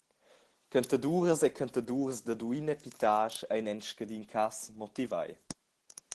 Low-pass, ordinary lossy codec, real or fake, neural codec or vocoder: 10.8 kHz; Opus, 16 kbps; real; none